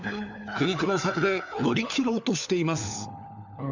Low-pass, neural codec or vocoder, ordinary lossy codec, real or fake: 7.2 kHz; codec, 16 kHz, 4 kbps, FunCodec, trained on LibriTTS, 50 frames a second; none; fake